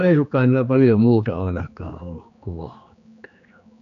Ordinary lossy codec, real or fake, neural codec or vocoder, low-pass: none; fake; codec, 16 kHz, 2 kbps, X-Codec, HuBERT features, trained on general audio; 7.2 kHz